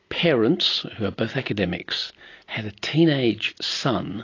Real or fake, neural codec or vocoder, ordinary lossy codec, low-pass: real; none; AAC, 32 kbps; 7.2 kHz